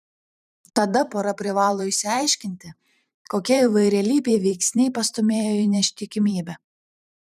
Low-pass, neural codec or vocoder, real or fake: 14.4 kHz; vocoder, 44.1 kHz, 128 mel bands every 512 samples, BigVGAN v2; fake